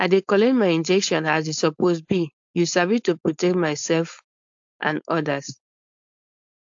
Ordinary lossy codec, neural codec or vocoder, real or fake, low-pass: AAC, 64 kbps; codec, 16 kHz, 4.8 kbps, FACodec; fake; 7.2 kHz